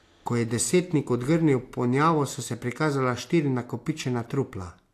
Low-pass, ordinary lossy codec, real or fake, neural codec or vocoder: 14.4 kHz; AAC, 64 kbps; real; none